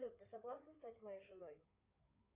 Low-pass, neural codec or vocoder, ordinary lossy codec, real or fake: 3.6 kHz; codec, 16 kHz in and 24 kHz out, 2.2 kbps, FireRedTTS-2 codec; Opus, 64 kbps; fake